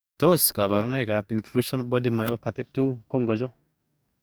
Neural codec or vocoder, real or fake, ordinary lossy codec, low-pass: codec, 44.1 kHz, 2.6 kbps, DAC; fake; none; none